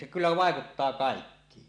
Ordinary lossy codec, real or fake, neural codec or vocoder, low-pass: none; real; none; 9.9 kHz